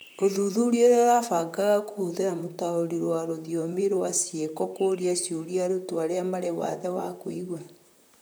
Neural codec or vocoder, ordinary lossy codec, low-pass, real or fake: vocoder, 44.1 kHz, 128 mel bands, Pupu-Vocoder; none; none; fake